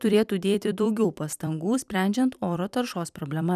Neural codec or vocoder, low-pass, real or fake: vocoder, 44.1 kHz, 128 mel bands every 256 samples, BigVGAN v2; 14.4 kHz; fake